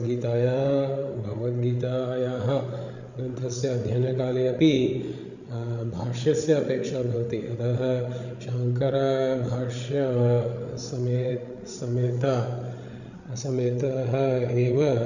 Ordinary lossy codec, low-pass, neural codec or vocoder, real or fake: none; 7.2 kHz; codec, 16 kHz, 8 kbps, FreqCodec, larger model; fake